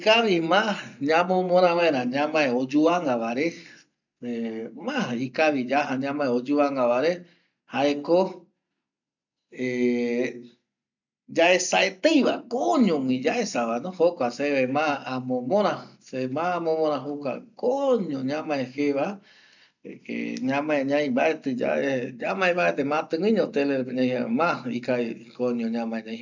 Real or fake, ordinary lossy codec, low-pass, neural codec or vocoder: real; none; 7.2 kHz; none